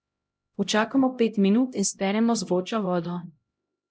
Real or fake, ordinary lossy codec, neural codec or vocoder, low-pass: fake; none; codec, 16 kHz, 0.5 kbps, X-Codec, HuBERT features, trained on LibriSpeech; none